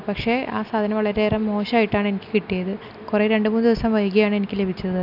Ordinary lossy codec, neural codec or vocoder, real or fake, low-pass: none; none; real; 5.4 kHz